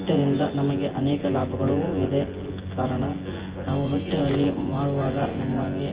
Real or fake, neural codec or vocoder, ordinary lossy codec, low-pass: fake; vocoder, 24 kHz, 100 mel bands, Vocos; Opus, 16 kbps; 3.6 kHz